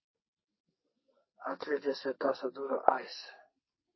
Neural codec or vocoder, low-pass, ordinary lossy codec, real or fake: codec, 44.1 kHz, 2.6 kbps, SNAC; 7.2 kHz; MP3, 24 kbps; fake